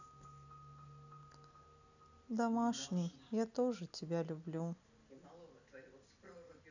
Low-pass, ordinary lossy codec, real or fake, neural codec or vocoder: 7.2 kHz; none; real; none